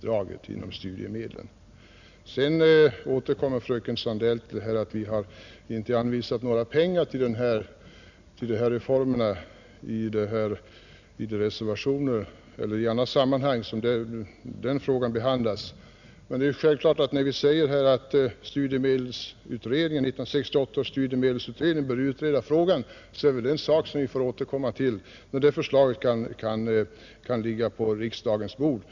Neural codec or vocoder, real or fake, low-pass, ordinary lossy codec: none; real; 7.2 kHz; none